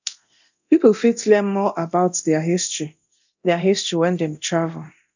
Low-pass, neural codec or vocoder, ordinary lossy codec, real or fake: 7.2 kHz; codec, 24 kHz, 0.9 kbps, DualCodec; none; fake